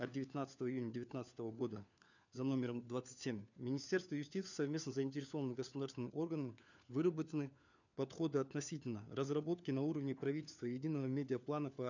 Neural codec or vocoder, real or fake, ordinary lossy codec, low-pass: codec, 16 kHz, 4 kbps, FunCodec, trained on LibriTTS, 50 frames a second; fake; none; 7.2 kHz